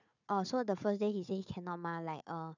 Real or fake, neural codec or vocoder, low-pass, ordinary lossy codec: fake; codec, 16 kHz, 4 kbps, FunCodec, trained on Chinese and English, 50 frames a second; 7.2 kHz; none